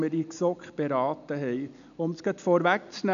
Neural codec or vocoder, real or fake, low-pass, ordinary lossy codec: none; real; 7.2 kHz; none